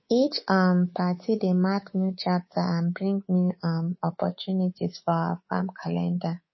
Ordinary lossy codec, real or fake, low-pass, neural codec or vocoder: MP3, 24 kbps; fake; 7.2 kHz; codec, 24 kHz, 3.1 kbps, DualCodec